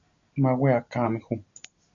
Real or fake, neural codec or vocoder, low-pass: real; none; 7.2 kHz